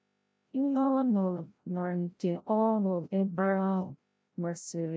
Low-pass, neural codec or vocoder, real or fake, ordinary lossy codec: none; codec, 16 kHz, 0.5 kbps, FreqCodec, larger model; fake; none